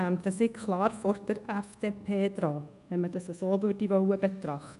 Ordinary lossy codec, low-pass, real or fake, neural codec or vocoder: none; 10.8 kHz; fake; codec, 24 kHz, 1.2 kbps, DualCodec